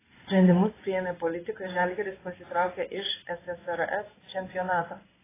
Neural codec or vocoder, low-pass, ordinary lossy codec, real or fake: codec, 16 kHz in and 24 kHz out, 1 kbps, XY-Tokenizer; 3.6 kHz; AAC, 16 kbps; fake